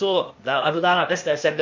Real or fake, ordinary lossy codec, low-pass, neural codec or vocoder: fake; MP3, 48 kbps; 7.2 kHz; codec, 16 kHz, 0.8 kbps, ZipCodec